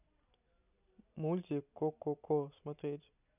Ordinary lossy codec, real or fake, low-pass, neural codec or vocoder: none; real; 3.6 kHz; none